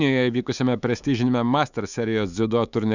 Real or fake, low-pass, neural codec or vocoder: fake; 7.2 kHz; autoencoder, 48 kHz, 128 numbers a frame, DAC-VAE, trained on Japanese speech